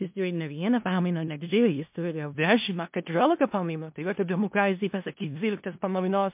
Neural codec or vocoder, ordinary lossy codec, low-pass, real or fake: codec, 16 kHz in and 24 kHz out, 0.4 kbps, LongCat-Audio-Codec, four codebook decoder; MP3, 32 kbps; 3.6 kHz; fake